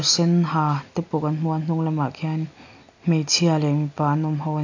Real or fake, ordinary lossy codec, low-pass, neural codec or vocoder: real; AAC, 48 kbps; 7.2 kHz; none